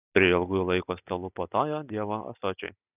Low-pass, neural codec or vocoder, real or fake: 3.6 kHz; none; real